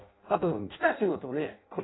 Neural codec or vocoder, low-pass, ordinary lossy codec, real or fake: codec, 16 kHz in and 24 kHz out, 0.6 kbps, FireRedTTS-2 codec; 7.2 kHz; AAC, 16 kbps; fake